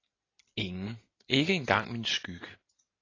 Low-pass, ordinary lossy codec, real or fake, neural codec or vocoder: 7.2 kHz; AAC, 32 kbps; real; none